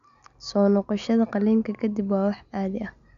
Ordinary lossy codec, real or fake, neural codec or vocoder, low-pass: none; real; none; 7.2 kHz